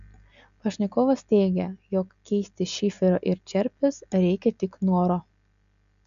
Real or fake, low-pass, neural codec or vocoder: real; 7.2 kHz; none